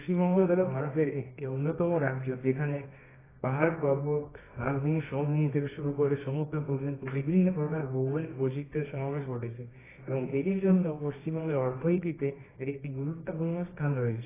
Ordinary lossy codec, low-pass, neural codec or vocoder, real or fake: AAC, 16 kbps; 3.6 kHz; codec, 24 kHz, 0.9 kbps, WavTokenizer, medium music audio release; fake